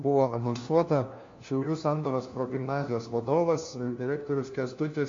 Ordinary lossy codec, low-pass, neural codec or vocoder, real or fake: MP3, 48 kbps; 7.2 kHz; codec, 16 kHz, 1 kbps, FunCodec, trained on LibriTTS, 50 frames a second; fake